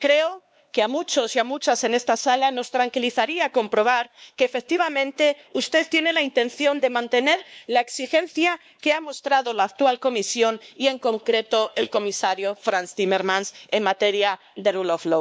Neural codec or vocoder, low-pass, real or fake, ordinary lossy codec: codec, 16 kHz, 2 kbps, X-Codec, WavLM features, trained on Multilingual LibriSpeech; none; fake; none